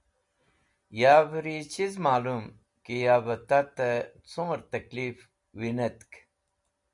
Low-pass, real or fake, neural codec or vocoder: 10.8 kHz; real; none